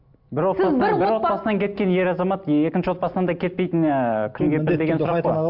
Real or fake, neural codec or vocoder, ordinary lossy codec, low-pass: real; none; MP3, 48 kbps; 5.4 kHz